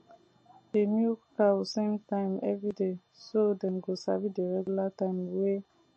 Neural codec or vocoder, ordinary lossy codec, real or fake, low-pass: none; MP3, 32 kbps; real; 10.8 kHz